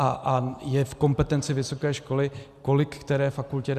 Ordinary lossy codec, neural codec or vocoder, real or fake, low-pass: Opus, 64 kbps; none; real; 14.4 kHz